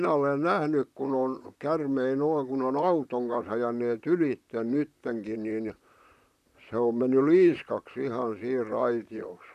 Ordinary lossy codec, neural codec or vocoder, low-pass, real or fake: none; vocoder, 44.1 kHz, 128 mel bands, Pupu-Vocoder; 14.4 kHz; fake